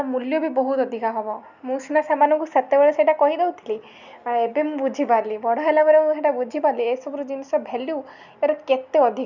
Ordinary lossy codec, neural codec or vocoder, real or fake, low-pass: none; none; real; 7.2 kHz